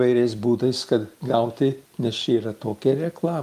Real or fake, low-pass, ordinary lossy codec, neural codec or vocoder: real; 14.4 kHz; Opus, 32 kbps; none